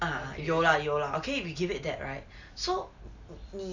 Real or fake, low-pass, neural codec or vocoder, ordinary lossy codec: real; 7.2 kHz; none; none